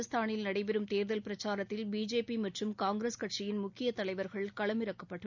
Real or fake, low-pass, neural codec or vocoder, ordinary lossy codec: real; 7.2 kHz; none; none